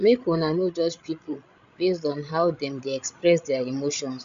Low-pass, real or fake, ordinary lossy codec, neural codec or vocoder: 7.2 kHz; fake; MP3, 64 kbps; codec, 16 kHz, 16 kbps, FreqCodec, larger model